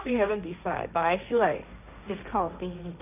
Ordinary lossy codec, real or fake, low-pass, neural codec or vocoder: none; fake; 3.6 kHz; codec, 16 kHz, 1.1 kbps, Voila-Tokenizer